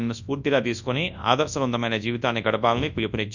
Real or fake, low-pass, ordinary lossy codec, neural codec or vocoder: fake; 7.2 kHz; none; codec, 24 kHz, 0.9 kbps, WavTokenizer, large speech release